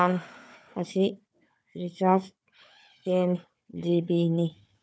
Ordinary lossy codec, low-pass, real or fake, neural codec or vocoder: none; none; fake; codec, 16 kHz, 4 kbps, FunCodec, trained on Chinese and English, 50 frames a second